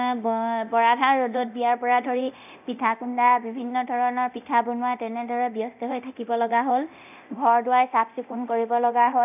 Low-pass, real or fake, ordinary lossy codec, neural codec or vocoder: 3.6 kHz; fake; none; codec, 24 kHz, 0.9 kbps, DualCodec